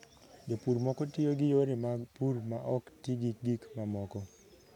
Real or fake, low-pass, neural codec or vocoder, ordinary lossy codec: real; 19.8 kHz; none; none